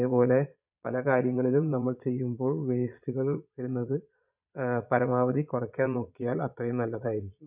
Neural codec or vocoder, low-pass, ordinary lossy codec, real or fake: vocoder, 22.05 kHz, 80 mel bands, Vocos; 3.6 kHz; none; fake